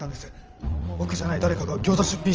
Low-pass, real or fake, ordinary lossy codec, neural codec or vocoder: 7.2 kHz; fake; Opus, 24 kbps; vocoder, 22.05 kHz, 80 mel bands, Vocos